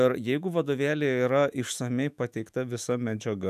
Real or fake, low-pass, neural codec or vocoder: fake; 14.4 kHz; autoencoder, 48 kHz, 128 numbers a frame, DAC-VAE, trained on Japanese speech